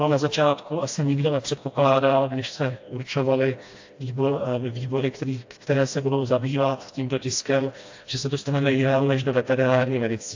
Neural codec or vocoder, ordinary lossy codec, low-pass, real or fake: codec, 16 kHz, 1 kbps, FreqCodec, smaller model; AAC, 48 kbps; 7.2 kHz; fake